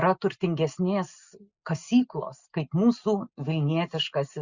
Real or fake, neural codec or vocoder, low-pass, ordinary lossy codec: real; none; 7.2 kHz; Opus, 64 kbps